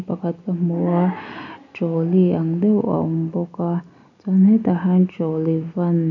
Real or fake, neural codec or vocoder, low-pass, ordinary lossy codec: real; none; 7.2 kHz; none